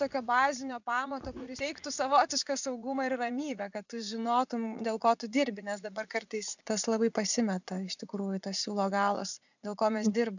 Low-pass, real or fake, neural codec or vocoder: 7.2 kHz; fake; vocoder, 44.1 kHz, 80 mel bands, Vocos